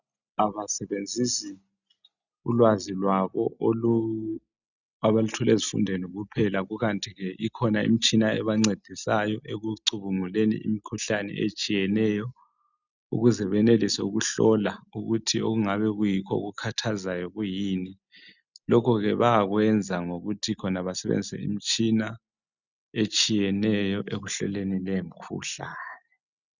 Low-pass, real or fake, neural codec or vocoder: 7.2 kHz; real; none